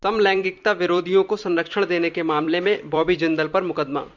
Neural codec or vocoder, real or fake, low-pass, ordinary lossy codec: vocoder, 44.1 kHz, 128 mel bands every 256 samples, BigVGAN v2; fake; 7.2 kHz; Opus, 64 kbps